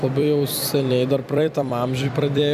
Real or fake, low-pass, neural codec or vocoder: fake; 14.4 kHz; vocoder, 44.1 kHz, 128 mel bands every 256 samples, BigVGAN v2